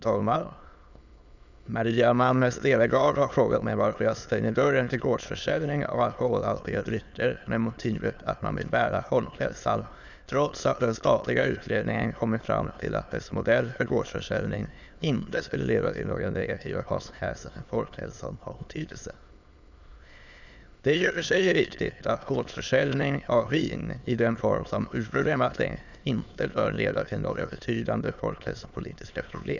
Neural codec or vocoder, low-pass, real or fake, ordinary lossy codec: autoencoder, 22.05 kHz, a latent of 192 numbers a frame, VITS, trained on many speakers; 7.2 kHz; fake; none